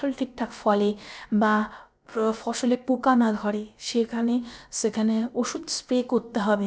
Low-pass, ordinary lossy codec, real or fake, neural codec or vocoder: none; none; fake; codec, 16 kHz, about 1 kbps, DyCAST, with the encoder's durations